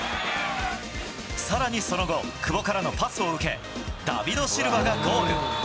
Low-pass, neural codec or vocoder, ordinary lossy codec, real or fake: none; none; none; real